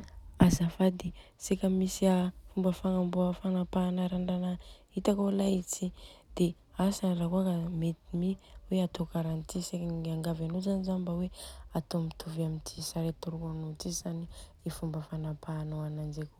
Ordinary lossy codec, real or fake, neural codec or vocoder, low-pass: none; real; none; 19.8 kHz